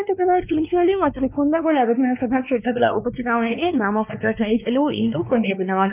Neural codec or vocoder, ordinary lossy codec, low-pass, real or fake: codec, 16 kHz, 2 kbps, X-Codec, WavLM features, trained on Multilingual LibriSpeech; none; 3.6 kHz; fake